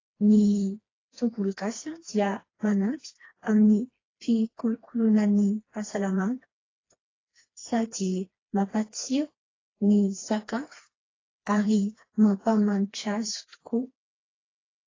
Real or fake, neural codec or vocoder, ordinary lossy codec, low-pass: fake; codec, 16 kHz, 2 kbps, FreqCodec, smaller model; AAC, 32 kbps; 7.2 kHz